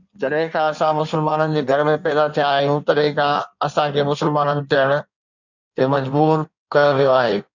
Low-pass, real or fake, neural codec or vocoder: 7.2 kHz; fake; codec, 16 kHz in and 24 kHz out, 1.1 kbps, FireRedTTS-2 codec